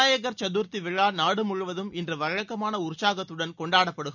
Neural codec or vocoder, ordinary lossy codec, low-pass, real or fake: none; none; 7.2 kHz; real